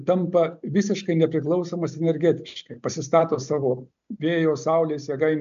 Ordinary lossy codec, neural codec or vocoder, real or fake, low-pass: MP3, 64 kbps; none; real; 7.2 kHz